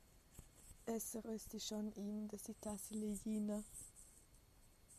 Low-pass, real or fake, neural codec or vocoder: 14.4 kHz; real; none